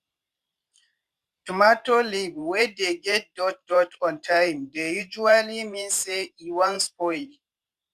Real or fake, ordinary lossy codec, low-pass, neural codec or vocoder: fake; Opus, 64 kbps; 14.4 kHz; vocoder, 44.1 kHz, 128 mel bands every 512 samples, BigVGAN v2